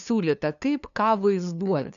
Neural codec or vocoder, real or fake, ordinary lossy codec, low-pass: codec, 16 kHz, 2 kbps, FunCodec, trained on LibriTTS, 25 frames a second; fake; MP3, 96 kbps; 7.2 kHz